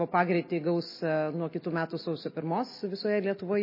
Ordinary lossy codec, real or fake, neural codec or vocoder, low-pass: MP3, 24 kbps; real; none; 5.4 kHz